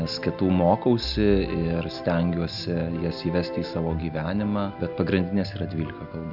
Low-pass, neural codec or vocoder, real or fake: 5.4 kHz; none; real